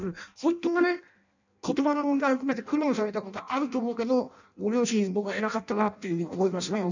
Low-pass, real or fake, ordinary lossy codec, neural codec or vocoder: 7.2 kHz; fake; none; codec, 16 kHz in and 24 kHz out, 0.6 kbps, FireRedTTS-2 codec